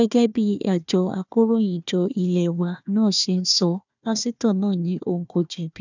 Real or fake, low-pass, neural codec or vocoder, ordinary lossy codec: fake; 7.2 kHz; codec, 16 kHz, 1 kbps, FunCodec, trained on Chinese and English, 50 frames a second; none